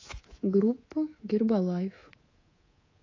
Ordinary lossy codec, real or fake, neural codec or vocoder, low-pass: AAC, 32 kbps; fake; codec, 24 kHz, 3.1 kbps, DualCodec; 7.2 kHz